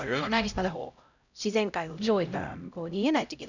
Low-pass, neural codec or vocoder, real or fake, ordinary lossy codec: 7.2 kHz; codec, 16 kHz, 0.5 kbps, X-Codec, HuBERT features, trained on LibriSpeech; fake; none